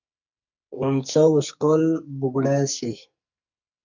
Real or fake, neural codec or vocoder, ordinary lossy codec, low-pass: fake; codec, 44.1 kHz, 2.6 kbps, SNAC; MP3, 64 kbps; 7.2 kHz